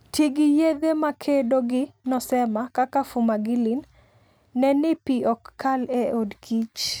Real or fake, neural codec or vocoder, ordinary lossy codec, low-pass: real; none; none; none